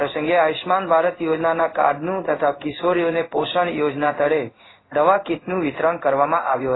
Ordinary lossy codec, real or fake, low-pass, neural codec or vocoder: AAC, 16 kbps; fake; 7.2 kHz; codec, 16 kHz in and 24 kHz out, 1 kbps, XY-Tokenizer